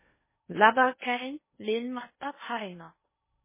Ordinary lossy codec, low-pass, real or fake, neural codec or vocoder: MP3, 16 kbps; 3.6 kHz; fake; codec, 16 kHz in and 24 kHz out, 0.6 kbps, FocalCodec, streaming, 4096 codes